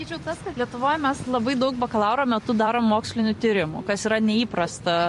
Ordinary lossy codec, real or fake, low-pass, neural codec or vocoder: MP3, 48 kbps; fake; 14.4 kHz; vocoder, 44.1 kHz, 128 mel bands every 256 samples, BigVGAN v2